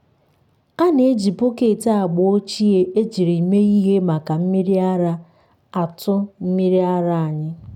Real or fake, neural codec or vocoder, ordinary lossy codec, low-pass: real; none; none; 19.8 kHz